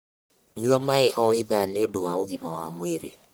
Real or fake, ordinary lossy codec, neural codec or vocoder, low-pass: fake; none; codec, 44.1 kHz, 1.7 kbps, Pupu-Codec; none